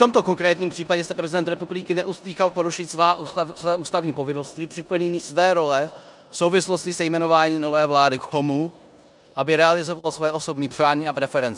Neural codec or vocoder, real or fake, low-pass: codec, 16 kHz in and 24 kHz out, 0.9 kbps, LongCat-Audio-Codec, four codebook decoder; fake; 10.8 kHz